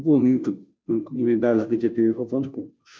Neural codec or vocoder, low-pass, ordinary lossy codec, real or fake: codec, 16 kHz, 0.5 kbps, FunCodec, trained on Chinese and English, 25 frames a second; none; none; fake